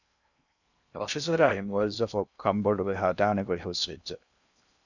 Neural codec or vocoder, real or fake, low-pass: codec, 16 kHz in and 24 kHz out, 0.6 kbps, FocalCodec, streaming, 2048 codes; fake; 7.2 kHz